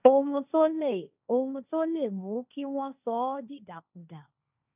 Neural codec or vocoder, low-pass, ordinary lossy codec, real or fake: codec, 16 kHz, 1.1 kbps, Voila-Tokenizer; 3.6 kHz; none; fake